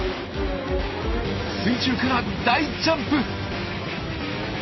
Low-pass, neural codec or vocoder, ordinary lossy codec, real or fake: 7.2 kHz; none; MP3, 24 kbps; real